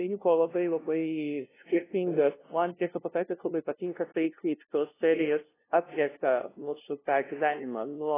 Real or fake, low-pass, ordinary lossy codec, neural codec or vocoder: fake; 3.6 kHz; AAC, 16 kbps; codec, 16 kHz, 0.5 kbps, FunCodec, trained on LibriTTS, 25 frames a second